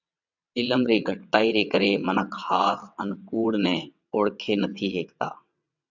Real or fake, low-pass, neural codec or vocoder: fake; 7.2 kHz; vocoder, 22.05 kHz, 80 mel bands, WaveNeXt